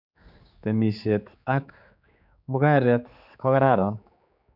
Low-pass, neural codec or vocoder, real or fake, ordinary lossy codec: 5.4 kHz; codec, 16 kHz, 4 kbps, X-Codec, HuBERT features, trained on general audio; fake; none